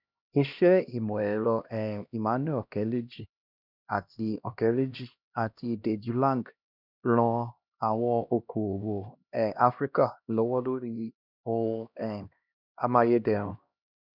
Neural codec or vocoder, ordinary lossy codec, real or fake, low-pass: codec, 16 kHz, 1 kbps, X-Codec, HuBERT features, trained on LibriSpeech; none; fake; 5.4 kHz